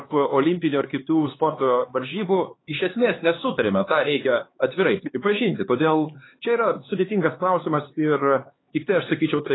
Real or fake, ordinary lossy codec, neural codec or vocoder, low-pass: fake; AAC, 16 kbps; codec, 16 kHz, 4 kbps, X-Codec, HuBERT features, trained on LibriSpeech; 7.2 kHz